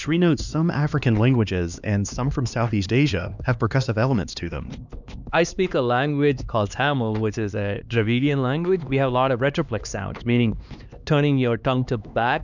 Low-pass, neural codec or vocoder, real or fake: 7.2 kHz; codec, 16 kHz, 2 kbps, X-Codec, HuBERT features, trained on LibriSpeech; fake